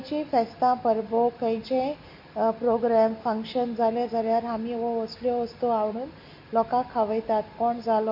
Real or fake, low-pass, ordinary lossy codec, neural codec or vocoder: real; 5.4 kHz; MP3, 32 kbps; none